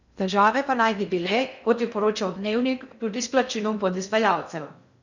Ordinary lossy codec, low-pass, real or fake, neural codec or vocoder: none; 7.2 kHz; fake; codec, 16 kHz in and 24 kHz out, 0.6 kbps, FocalCodec, streaming, 2048 codes